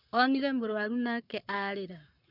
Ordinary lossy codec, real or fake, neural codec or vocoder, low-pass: none; fake; codec, 44.1 kHz, 7.8 kbps, Pupu-Codec; 5.4 kHz